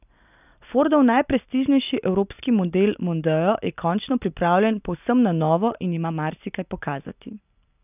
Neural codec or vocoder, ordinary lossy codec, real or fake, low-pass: none; none; real; 3.6 kHz